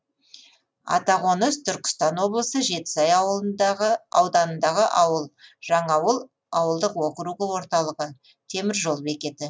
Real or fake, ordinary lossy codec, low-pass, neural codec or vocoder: real; none; none; none